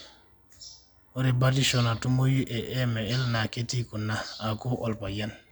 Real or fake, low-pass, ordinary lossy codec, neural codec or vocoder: real; none; none; none